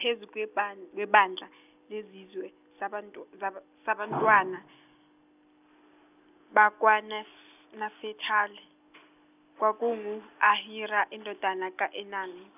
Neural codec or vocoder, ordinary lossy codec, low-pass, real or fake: none; none; 3.6 kHz; real